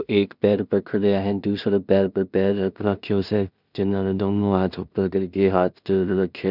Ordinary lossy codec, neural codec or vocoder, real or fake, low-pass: none; codec, 16 kHz in and 24 kHz out, 0.4 kbps, LongCat-Audio-Codec, two codebook decoder; fake; 5.4 kHz